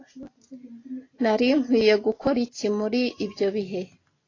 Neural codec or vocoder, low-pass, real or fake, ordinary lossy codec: none; 7.2 kHz; real; AAC, 32 kbps